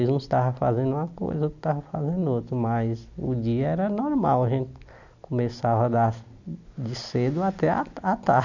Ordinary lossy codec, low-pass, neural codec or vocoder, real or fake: none; 7.2 kHz; none; real